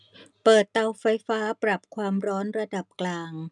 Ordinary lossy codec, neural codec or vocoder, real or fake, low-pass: none; none; real; 10.8 kHz